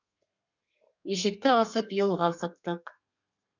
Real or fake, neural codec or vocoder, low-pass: fake; codec, 32 kHz, 1.9 kbps, SNAC; 7.2 kHz